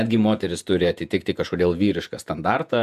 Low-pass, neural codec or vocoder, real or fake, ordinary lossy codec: 14.4 kHz; vocoder, 48 kHz, 128 mel bands, Vocos; fake; AAC, 96 kbps